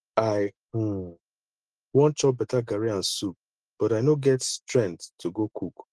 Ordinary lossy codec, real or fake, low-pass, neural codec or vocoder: Opus, 16 kbps; real; 10.8 kHz; none